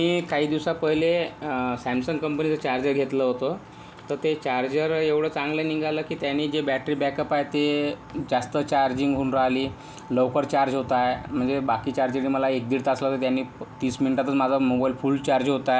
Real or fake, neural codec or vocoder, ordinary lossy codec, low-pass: real; none; none; none